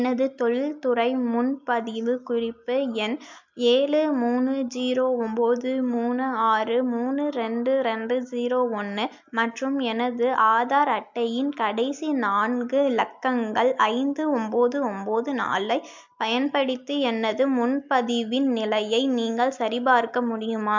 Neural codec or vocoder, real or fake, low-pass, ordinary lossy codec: none; real; 7.2 kHz; MP3, 64 kbps